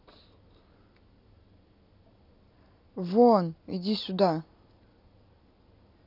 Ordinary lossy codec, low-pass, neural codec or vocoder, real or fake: none; 5.4 kHz; none; real